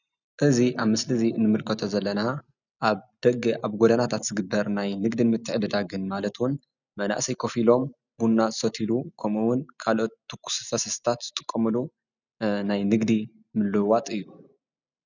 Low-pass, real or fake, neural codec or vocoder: 7.2 kHz; real; none